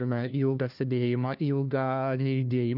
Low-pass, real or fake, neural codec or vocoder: 5.4 kHz; fake; codec, 16 kHz, 1 kbps, FunCodec, trained on LibriTTS, 50 frames a second